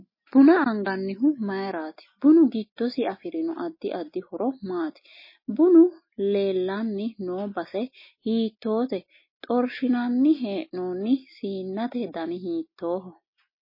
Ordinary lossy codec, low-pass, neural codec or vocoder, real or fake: MP3, 24 kbps; 5.4 kHz; none; real